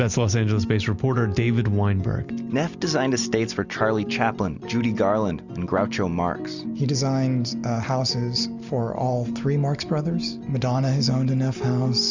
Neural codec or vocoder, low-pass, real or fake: none; 7.2 kHz; real